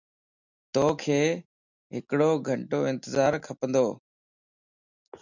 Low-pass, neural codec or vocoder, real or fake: 7.2 kHz; none; real